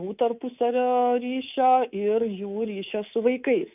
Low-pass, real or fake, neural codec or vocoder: 3.6 kHz; fake; codec, 24 kHz, 3.1 kbps, DualCodec